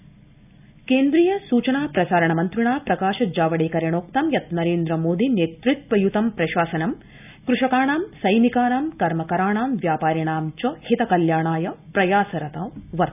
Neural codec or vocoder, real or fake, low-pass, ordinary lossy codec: none; real; 3.6 kHz; none